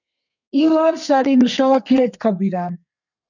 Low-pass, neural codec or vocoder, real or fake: 7.2 kHz; codec, 32 kHz, 1.9 kbps, SNAC; fake